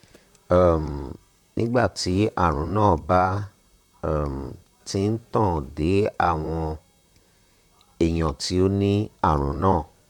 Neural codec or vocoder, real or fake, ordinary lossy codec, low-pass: vocoder, 44.1 kHz, 128 mel bands, Pupu-Vocoder; fake; none; 19.8 kHz